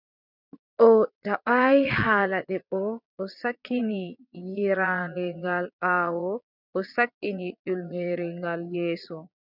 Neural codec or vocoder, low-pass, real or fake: vocoder, 22.05 kHz, 80 mel bands, Vocos; 5.4 kHz; fake